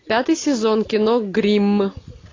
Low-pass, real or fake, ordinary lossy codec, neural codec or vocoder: 7.2 kHz; real; AAC, 32 kbps; none